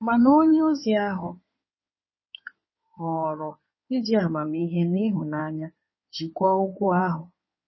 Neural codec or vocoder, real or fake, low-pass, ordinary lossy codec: codec, 16 kHz in and 24 kHz out, 2.2 kbps, FireRedTTS-2 codec; fake; 7.2 kHz; MP3, 24 kbps